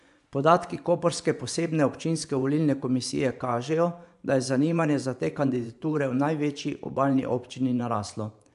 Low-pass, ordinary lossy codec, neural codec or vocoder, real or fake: 10.8 kHz; AAC, 96 kbps; vocoder, 24 kHz, 100 mel bands, Vocos; fake